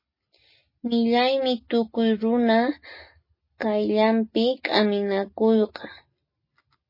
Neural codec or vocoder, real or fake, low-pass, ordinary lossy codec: none; real; 5.4 kHz; MP3, 24 kbps